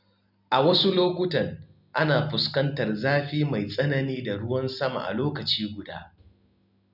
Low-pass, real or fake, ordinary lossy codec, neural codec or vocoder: 5.4 kHz; real; none; none